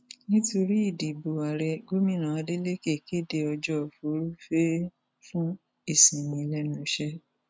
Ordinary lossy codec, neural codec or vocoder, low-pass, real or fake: none; none; none; real